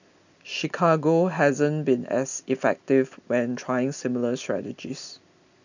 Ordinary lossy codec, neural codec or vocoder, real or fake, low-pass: none; none; real; 7.2 kHz